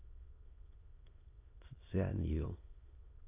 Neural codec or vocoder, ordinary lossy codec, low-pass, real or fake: autoencoder, 22.05 kHz, a latent of 192 numbers a frame, VITS, trained on many speakers; AAC, 24 kbps; 3.6 kHz; fake